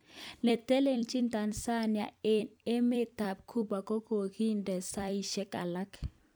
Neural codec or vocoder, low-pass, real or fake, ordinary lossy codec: vocoder, 44.1 kHz, 128 mel bands every 256 samples, BigVGAN v2; none; fake; none